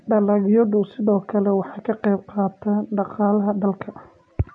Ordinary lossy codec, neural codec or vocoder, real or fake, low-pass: none; none; real; 9.9 kHz